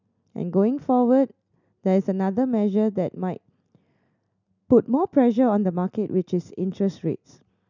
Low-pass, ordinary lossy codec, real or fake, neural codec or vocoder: 7.2 kHz; none; real; none